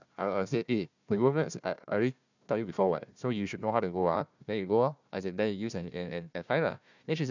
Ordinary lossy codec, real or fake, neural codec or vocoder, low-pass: none; fake; codec, 16 kHz, 1 kbps, FunCodec, trained on Chinese and English, 50 frames a second; 7.2 kHz